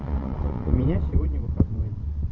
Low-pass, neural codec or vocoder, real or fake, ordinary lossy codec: 7.2 kHz; vocoder, 22.05 kHz, 80 mel bands, WaveNeXt; fake; MP3, 32 kbps